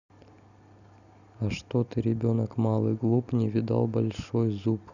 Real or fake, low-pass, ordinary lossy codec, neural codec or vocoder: real; 7.2 kHz; none; none